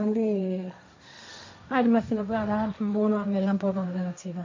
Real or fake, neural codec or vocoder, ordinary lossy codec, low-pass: fake; codec, 16 kHz, 1.1 kbps, Voila-Tokenizer; MP3, 48 kbps; 7.2 kHz